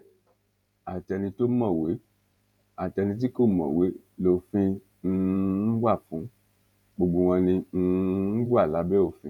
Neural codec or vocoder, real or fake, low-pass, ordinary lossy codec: vocoder, 48 kHz, 128 mel bands, Vocos; fake; 19.8 kHz; none